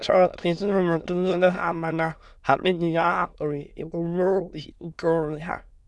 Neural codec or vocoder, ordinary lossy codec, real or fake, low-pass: autoencoder, 22.05 kHz, a latent of 192 numbers a frame, VITS, trained on many speakers; none; fake; none